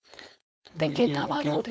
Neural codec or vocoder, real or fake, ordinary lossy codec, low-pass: codec, 16 kHz, 4.8 kbps, FACodec; fake; none; none